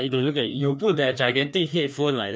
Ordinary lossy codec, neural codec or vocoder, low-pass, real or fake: none; codec, 16 kHz, 2 kbps, FreqCodec, larger model; none; fake